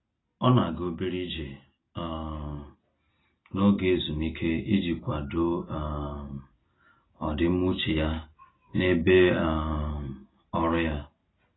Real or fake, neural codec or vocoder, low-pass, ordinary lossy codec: real; none; 7.2 kHz; AAC, 16 kbps